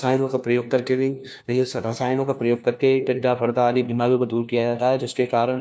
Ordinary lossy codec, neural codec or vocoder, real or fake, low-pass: none; codec, 16 kHz, 1 kbps, FunCodec, trained on LibriTTS, 50 frames a second; fake; none